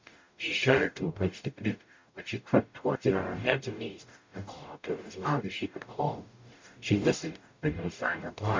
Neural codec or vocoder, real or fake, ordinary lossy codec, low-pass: codec, 44.1 kHz, 0.9 kbps, DAC; fake; MP3, 64 kbps; 7.2 kHz